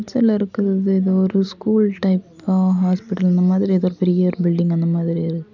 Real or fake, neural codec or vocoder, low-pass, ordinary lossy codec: real; none; 7.2 kHz; none